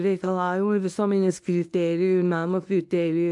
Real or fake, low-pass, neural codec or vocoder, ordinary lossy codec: fake; 10.8 kHz; codec, 24 kHz, 0.9 kbps, WavTokenizer, medium speech release version 1; AAC, 64 kbps